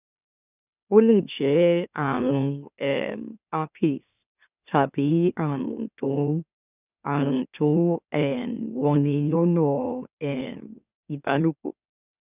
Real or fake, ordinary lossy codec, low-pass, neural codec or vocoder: fake; none; 3.6 kHz; autoencoder, 44.1 kHz, a latent of 192 numbers a frame, MeloTTS